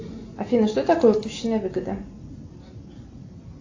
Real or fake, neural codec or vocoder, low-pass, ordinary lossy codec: real; none; 7.2 kHz; AAC, 48 kbps